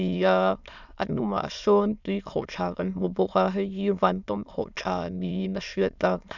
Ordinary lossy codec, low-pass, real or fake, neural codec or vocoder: none; 7.2 kHz; fake; autoencoder, 22.05 kHz, a latent of 192 numbers a frame, VITS, trained on many speakers